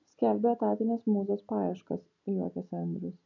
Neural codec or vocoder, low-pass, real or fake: none; 7.2 kHz; real